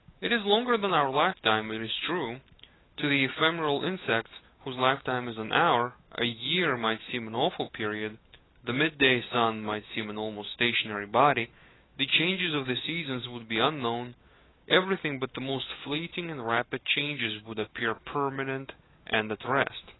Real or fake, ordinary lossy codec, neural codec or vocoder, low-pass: fake; AAC, 16 kbps; autoencoder, 48 kHz, 128 numbers a frame, DAC-VAE, trained on Japanese speech; 7.2 kHz